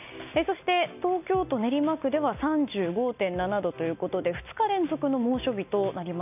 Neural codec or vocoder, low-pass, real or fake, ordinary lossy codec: none; 3.6 kHz; real; none